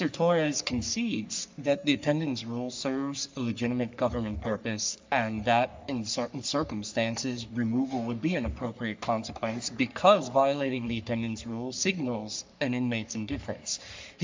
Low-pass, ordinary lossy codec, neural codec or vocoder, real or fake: 7.2 kHz; MP3, 64 kbps; codec, 44.1 kHz, 3.4 kbps, Pupu-Codec; fake